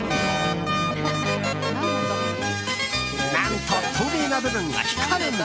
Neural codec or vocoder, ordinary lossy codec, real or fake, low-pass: none; none; real; none